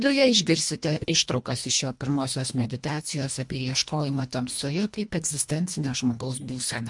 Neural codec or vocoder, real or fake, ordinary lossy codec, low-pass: codec, 24 kHz, 1.5 kbps, HILCodec; fake; MP3, 64 kbps; 10.8 kHz